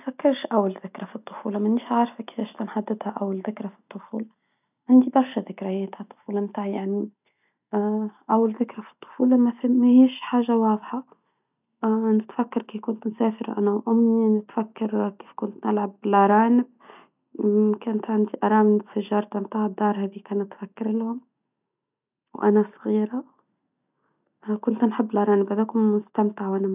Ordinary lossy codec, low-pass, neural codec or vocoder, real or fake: none; 3.6 kHz; none; real